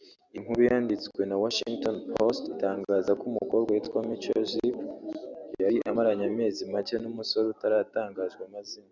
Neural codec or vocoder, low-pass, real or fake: none; 7.2 kHz; real